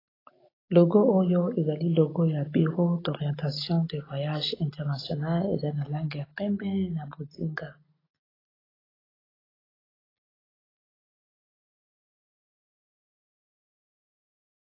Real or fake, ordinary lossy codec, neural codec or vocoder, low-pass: real; AAC, 24 kbps; none; 5.4 kHz